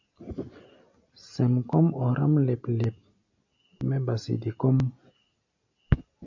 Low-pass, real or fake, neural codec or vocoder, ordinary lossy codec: 7.2 kHz; real; none; AAC, 48 kbps